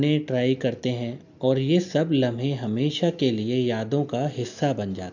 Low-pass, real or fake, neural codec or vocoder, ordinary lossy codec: 7.2 kHz; real; none; none